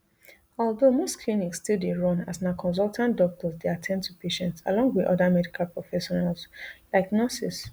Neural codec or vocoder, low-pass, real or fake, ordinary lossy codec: none; none; real; none